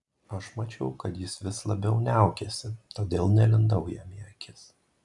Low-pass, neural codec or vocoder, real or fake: 10.8 kHz; none; real